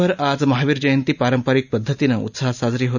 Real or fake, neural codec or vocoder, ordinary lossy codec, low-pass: real; none; none; 7.2 kHz